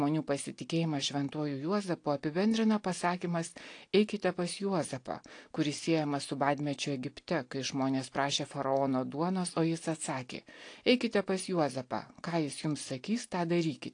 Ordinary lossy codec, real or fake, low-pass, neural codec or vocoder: AAC, 48 kbps; real; 9.9 kHz; none